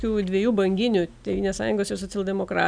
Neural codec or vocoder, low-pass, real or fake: none; 9.9 kHz; real